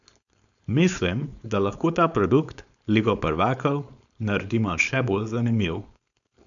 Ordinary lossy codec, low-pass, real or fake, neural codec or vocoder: none; 7.2 kHz; fake; codec, 16 kHz, 4.8 kbps, FACodec